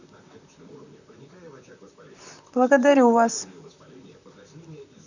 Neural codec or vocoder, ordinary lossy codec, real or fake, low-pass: vocoder, 44.1 kHz, 128 mel bands, Pupu-Vocoder; none; fake; 7.2 kHz